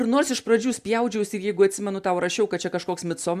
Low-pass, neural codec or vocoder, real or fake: 14.4 kHz; none; real